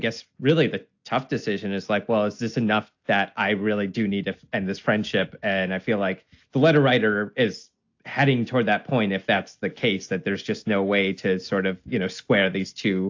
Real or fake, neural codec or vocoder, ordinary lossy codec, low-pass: real; none; AAC, 48 kbps; 7.2 kHz